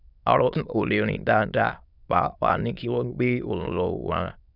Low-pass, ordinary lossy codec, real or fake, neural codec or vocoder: 5.4 kHz; none; fake; autoencoder, 22.05 kHz, a latent of 192 numbers a frame, VITS, trained on many speakers